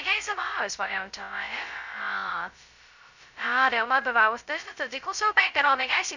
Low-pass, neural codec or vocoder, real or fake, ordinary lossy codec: 7.2 kHz; codec, 16 kHz, 0.2 kbps, FocalCodec; fake; none